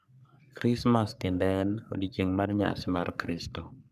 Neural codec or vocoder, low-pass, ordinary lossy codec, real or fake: codec, 44.1 kHz, 3.4 kbps, Pupu-Codec; 14.4 kHz; none; fake